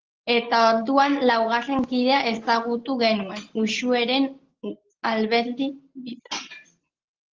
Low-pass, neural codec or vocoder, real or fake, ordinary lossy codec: 7.2 kHz; none; real; Opus, 16 kbps